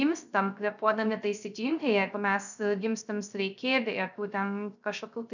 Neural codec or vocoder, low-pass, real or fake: codec, 16 kHz, 0.3 kbps, FocalCodec; 7.2 kHz; fake